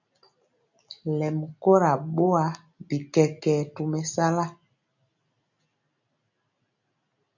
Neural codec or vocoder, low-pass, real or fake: none; 7.2 kHz; real